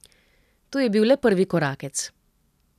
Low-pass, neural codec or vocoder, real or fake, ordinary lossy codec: 14.4 kHz; none; real; none